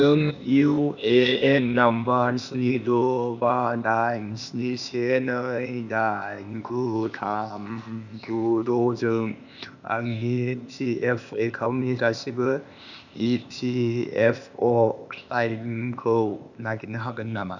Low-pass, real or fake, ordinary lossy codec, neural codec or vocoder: 7.2 kHz; fake; none; codec, 16 kHz, 0.8 kbps, ZipCodec